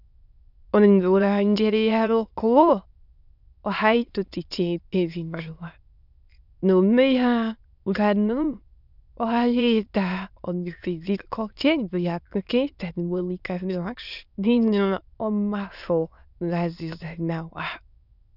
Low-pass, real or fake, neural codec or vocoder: 5.4 kHz; fake; autoencoder, 22.05 kHz, a latent of 192 numbers a frame, VITS, trained on many speakers